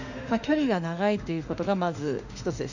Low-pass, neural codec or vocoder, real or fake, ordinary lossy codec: 7.2 kHz; autoencoder, 48 kHz, 32 numbers a frame, DAC-VAE, trained on Japanese speech; fake; none